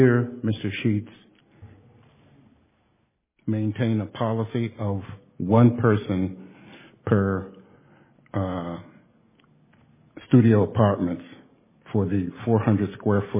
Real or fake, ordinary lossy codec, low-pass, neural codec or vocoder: real; MP3, 16 kbps; 3.6 kHz; none